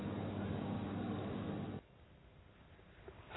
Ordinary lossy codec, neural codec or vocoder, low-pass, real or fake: AAC, 16 kbps; none; 7.2 kHz; real